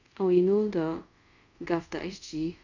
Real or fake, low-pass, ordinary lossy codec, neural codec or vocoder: fake; 7.2 kHz; none; codec, 24 kHz, 0.5 kbps, DualCodec